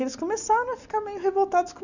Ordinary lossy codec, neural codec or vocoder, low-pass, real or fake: none; none; 7.2 kHz; real